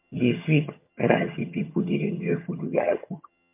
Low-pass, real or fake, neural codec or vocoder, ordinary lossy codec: 3.6 kHz; fake; vocoder, 22.05 kHz, 80 mel bands, HiFi-GAN; MP3, 32 kbps